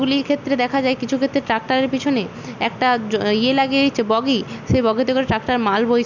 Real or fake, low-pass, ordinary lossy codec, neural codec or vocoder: real; 7.2 kHz; none; none